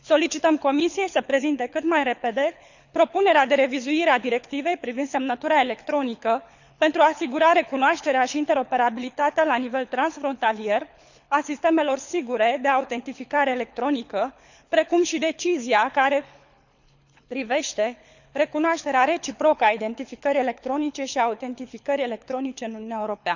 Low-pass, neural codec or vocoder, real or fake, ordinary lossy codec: 7.2 kHz; codec, 24 kHz, 6 kbps, HILCodec; fake; none